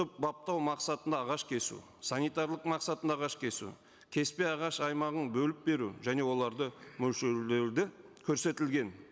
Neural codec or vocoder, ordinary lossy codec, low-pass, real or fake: none; none; none; real